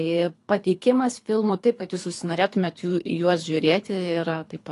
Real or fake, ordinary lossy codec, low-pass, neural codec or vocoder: fake; AAC, 48 kbps; 10.8 kHz; codec, 24 kHz, 3 kbps, HILCodec